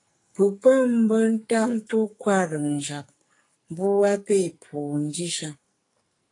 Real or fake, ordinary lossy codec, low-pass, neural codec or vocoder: fake; AAC, 48 kbps; 10.8 kHz; codec, 44.1 kHz, 2.6 kbps, SNAC